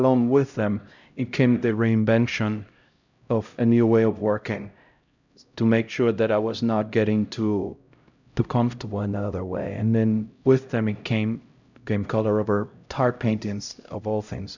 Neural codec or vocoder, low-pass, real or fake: codec, 16 kHz, 0.5 kbps, X-Codec, HuBERT features, trained on LibriSpeech; 7.2 kHz; fake